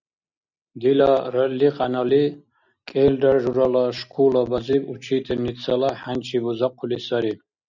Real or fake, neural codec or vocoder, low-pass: real; none; 7.2 kHz